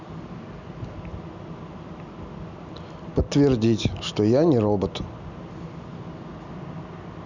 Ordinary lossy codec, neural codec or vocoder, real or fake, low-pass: none; none; real; 7.2 kHz